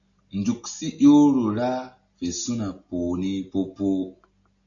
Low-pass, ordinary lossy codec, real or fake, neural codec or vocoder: 7.2 kHz; MP3, 64 kbps; real; none